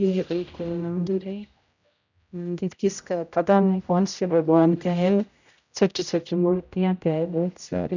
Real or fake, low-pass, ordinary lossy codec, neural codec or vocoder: fake; 7.2 kHz; none; codec, 16 kHz, 0.5 kbps, X-Codec, HuBERT features, trained on general audio